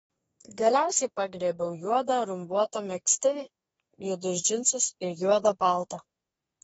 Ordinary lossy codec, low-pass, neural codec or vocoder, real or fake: AAC, 24 kbps; 14.4 kHz; codec, 32 kHz, 1.9 kbps, SNAC; fake